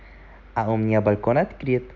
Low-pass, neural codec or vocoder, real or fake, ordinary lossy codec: 7.2 kHz; none; real; none